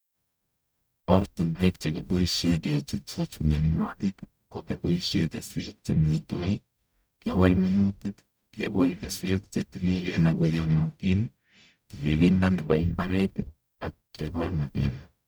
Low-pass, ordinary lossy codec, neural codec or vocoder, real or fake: none; none; codec, 44.1 kHz, 0.9 kbps, DAC; fake